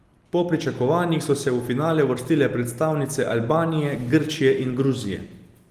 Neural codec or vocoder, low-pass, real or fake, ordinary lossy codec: none; 14.4 kHz; real; Opus, 24 kbps